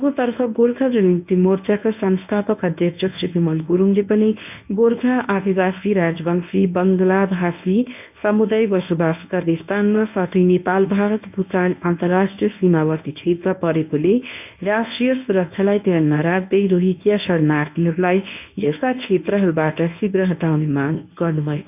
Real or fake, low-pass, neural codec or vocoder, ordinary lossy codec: fake; 3.6 kHz; codec, 24 kHz, 0.9 kbps, WavTokenizer, medium speech release version 1; none